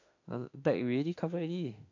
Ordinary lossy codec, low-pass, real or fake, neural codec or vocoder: AAC, 48 kbps; 7.2 kHz; fake; autoencoder, 48 kHz, 32 numbers a frame, DAC-VAE, trained on Japanese speech